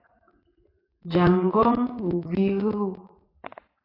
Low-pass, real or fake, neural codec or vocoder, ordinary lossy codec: 5.4 kHz; fake; vocoder, 44.1 kHz, 80 mel bands, Vocos; AAC, 24 kbps